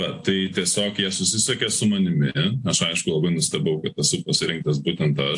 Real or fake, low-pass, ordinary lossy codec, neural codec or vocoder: real; 10.8 kHz; AAC, 64 kbps; none